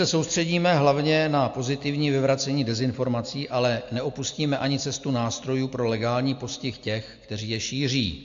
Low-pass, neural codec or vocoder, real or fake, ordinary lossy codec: 7.2 kHz; none; real; AAC, 64 kbps